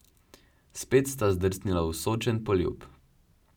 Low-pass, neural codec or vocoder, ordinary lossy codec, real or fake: 19.8 kHz; none; none; real